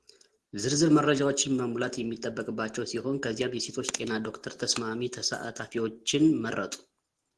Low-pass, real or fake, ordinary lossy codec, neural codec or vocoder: 10.8 kHz; real; Opus, 16 kbps; none